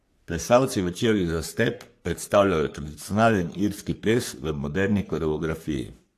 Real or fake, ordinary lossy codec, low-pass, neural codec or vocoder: fake; AAC, 64 kbps; 14.4 kHz; codec, 44.1 kHz, 3.4 kbps, Pupu-Codec